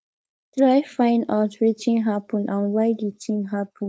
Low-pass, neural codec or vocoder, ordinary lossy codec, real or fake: none; codec, 16 kHz, 4.8 kbps, FACodec; none; fake